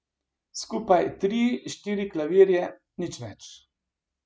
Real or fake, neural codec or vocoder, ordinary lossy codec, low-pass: real; none; none; none